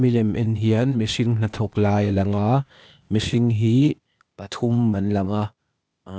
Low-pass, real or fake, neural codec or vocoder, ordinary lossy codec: none; fake; codec, 16 kHz, 0.8 kbps, ZipCodec; none